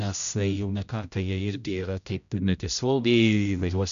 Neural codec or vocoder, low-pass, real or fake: codec, 16 kHz, 0.5 kbps, X-Codec, HuBERT features, trained on general audio; 7.2 kHz; fake